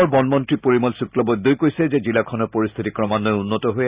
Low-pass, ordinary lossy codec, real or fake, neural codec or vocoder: 3.6 kHz; Opus, 64 kbps; real; none